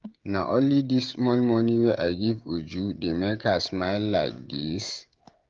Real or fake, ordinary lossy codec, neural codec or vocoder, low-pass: fake; Opus, 32 kbps; codec, 16 kHz, 16 kbps, FreqCodec, smaller model; 7.2 kHz